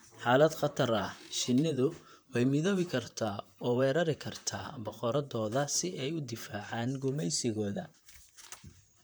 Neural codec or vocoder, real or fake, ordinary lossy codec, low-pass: vocoder, 44.1 kHz, 128 mel bands, Pupu-Vocoder; fake; none; none